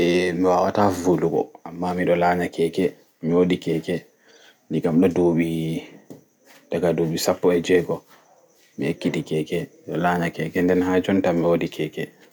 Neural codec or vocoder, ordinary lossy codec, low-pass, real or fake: none; none; none; real